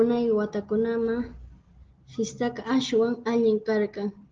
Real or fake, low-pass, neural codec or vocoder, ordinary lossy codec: real; 7.2 kHz; none; Opus, 16 kbps